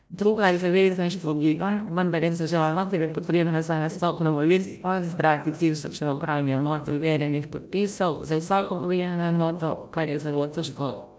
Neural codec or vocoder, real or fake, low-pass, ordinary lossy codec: codec, 16 kHz, 0.5 kbps, FreqCodec, larger model; fake; none; none